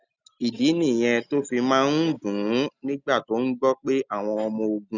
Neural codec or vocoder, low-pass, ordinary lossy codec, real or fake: none; 7.2 kHz; none; real